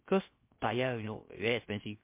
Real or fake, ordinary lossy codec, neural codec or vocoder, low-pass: fake; MP3, 24 kbps; codec, 16 kHz, about 1 kbps, DyCAST, with the encoder's durations; 3.6 kHz